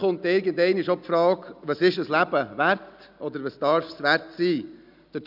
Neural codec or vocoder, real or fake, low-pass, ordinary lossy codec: none; real; 5.4 kHz; none